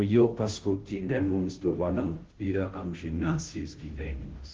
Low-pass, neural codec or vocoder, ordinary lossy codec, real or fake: 7.2 kHz; codec, 16 kHz, 0.5 kbps, FunCodec, trained on Chinese and English, 25 frames a second; Opus, 16 kbps; fake